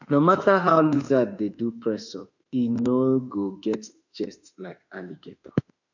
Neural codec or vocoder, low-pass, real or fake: autoencoder, 48 kHz, 32 numbers a frame, DAC-VAE, trained on Japanese speech; 7.2 kHz; fake